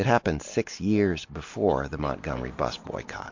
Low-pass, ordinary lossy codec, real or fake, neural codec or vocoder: 7.2 kHz; MP3, 48 kbps; real; none